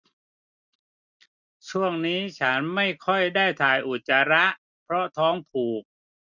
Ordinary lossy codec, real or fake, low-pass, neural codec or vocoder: none; real; 7.2 kHz; none